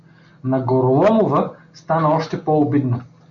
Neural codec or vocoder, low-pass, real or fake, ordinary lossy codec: none; 7.2 kHz; real; MP3, 64 kbps